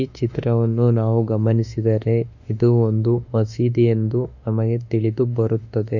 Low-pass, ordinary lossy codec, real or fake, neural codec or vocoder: 7.2 kHz; none; fake; autoencoder, 48 kHz, 32 numbers a frame, DAC-VAE, trained on Japanese speech